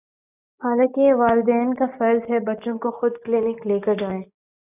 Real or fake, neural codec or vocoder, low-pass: real; none; 3.6 kHz